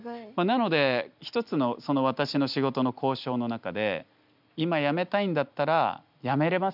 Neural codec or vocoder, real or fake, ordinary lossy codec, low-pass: none; real; none; 5.4 kHz